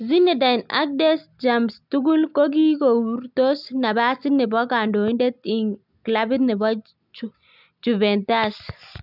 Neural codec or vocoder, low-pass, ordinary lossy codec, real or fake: none; 5.4 kHz; none; real